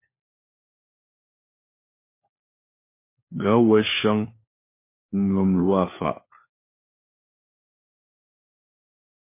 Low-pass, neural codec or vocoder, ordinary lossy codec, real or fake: 3.6 kHz; codec, 16 kHz, 4 kbps, FunCodec, trained on LibriTTS, 50 frames a second; MP3, 24 kbps; fake